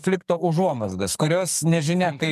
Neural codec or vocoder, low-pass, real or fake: codec, 44.1 kHz, 2.6 kbps, SNAC; 14.4 kHz; fake